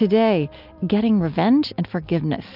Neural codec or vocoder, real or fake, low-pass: none; real; 5.4 kHz